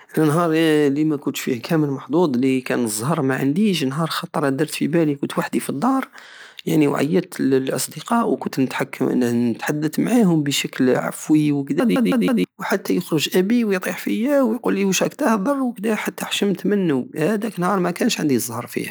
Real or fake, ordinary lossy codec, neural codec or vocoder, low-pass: real; none; none; none